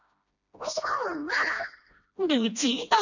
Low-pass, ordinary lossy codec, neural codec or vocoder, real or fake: 7.2 kHz; none; codec, 16 kHz, 1 kbps, FreqCodec, smaller model; fake